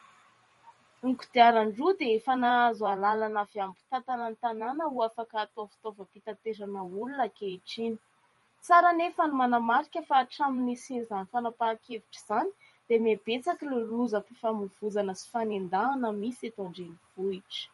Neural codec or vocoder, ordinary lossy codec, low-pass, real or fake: vocoder, 44.1 kHz, 128 mel bands every 512 samples, BigVGAN v2; MP3, 48 kbps; 19.8 kHz; fake